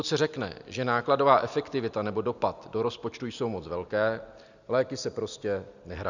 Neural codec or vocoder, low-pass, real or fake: none; 7.2 kHz; real